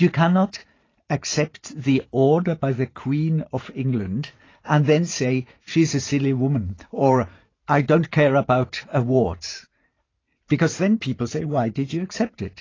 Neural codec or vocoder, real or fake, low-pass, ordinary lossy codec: vocoder, 44.1 kHz, 128 mel bands every 512 samples, BigVGAN v2; fake; 7.2 kHz; AAC, 32 kbps